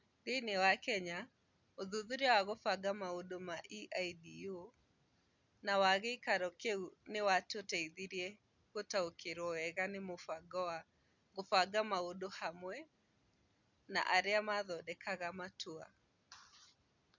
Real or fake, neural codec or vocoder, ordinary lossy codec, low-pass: real; none; none; 7.2 kHz